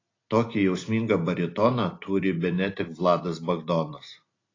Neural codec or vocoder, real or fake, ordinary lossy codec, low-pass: none; real; AAC, 32 kbps; 7.2 kHz